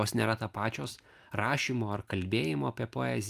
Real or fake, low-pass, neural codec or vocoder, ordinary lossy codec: real; 14.4 kHz; none; Opus, 32 kbps